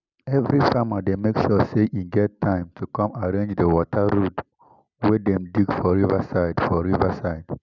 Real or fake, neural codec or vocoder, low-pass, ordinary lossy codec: real; none; 7.2 kHz; none